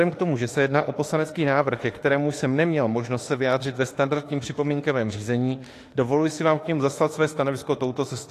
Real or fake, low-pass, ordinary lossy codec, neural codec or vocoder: fake; 14.4 kHz; AAC, 48 kbps; autoencoder, 48 kHz, 32 numbers a frame, DAC-VAE, trained on Japanese speech